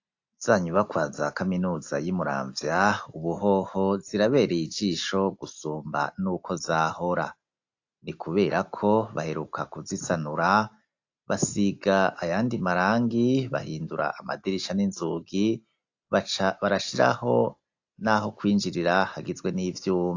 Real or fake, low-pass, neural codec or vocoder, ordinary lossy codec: real; 7.2 kHz; none; AAC, 48 kbps